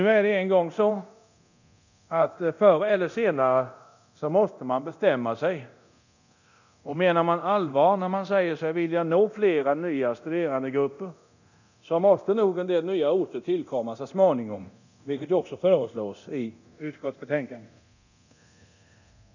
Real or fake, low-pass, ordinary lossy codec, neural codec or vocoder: fake; 7.2 kHz; none; codec, 24 kHz, 0.9 kbps, DualCodec